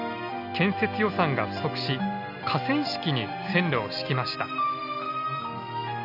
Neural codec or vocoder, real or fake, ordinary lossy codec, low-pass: none; real; none; 5.4 kHz